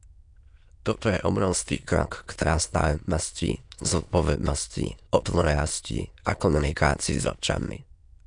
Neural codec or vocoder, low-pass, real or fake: autoencoder, 22.05 kHz, a latent of 192 numbers a frame, VITS, trained on many speakers; 9.9 kHz; fake